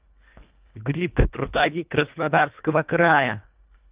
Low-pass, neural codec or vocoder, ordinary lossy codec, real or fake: 3.6 kHz; codec, 24 kHz, 1.5 kbps, HILCodec; Opus, 24 kbps; fake